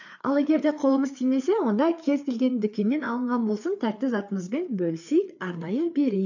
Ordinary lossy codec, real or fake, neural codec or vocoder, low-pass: none; fake; codec, 16 kHz, 4 kbps, FreqCodec, larger model; 7.2 kHz